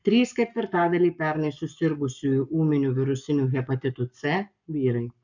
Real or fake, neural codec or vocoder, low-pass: fake; codec, 44.1 kHz, 7.8 kbps, Pupu-Codec; 7.2 kHz